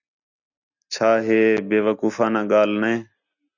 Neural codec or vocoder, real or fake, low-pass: none; real; 7.2 kHz